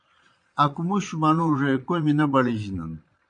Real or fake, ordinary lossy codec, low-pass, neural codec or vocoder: fake; MP3, 64 kbps; 9.9 kHz; vocoder, 22.05 kHz, 80 mel bands, Vocos